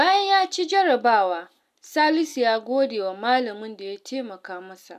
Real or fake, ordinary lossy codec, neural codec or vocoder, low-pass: real; none; none; 14.4 kHz